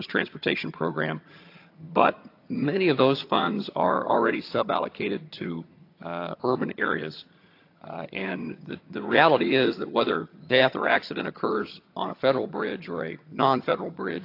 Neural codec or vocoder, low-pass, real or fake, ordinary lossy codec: vocoder, 22.05 kHz, 80 mel bands, HiFi-GAN; 5.4 kHz; fake; AAC, 32 kbps